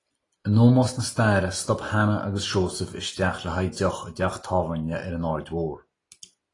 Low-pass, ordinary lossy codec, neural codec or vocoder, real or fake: 10.8 kHz; AAC, 32 kbps; none; real